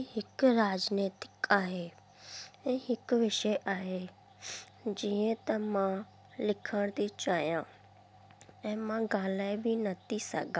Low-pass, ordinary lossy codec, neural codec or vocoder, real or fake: none; none; none; real